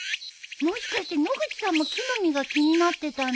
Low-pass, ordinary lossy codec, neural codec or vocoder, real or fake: none; none; none; real